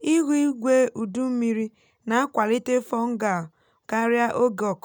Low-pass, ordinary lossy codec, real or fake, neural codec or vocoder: 19.8 kHz; none; real; none